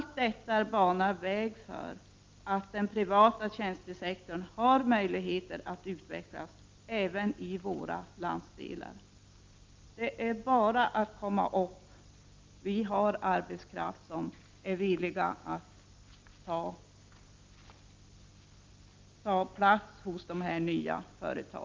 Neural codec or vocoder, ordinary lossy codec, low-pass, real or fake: none; Opus, 24 kbps; 7.2 kHz; real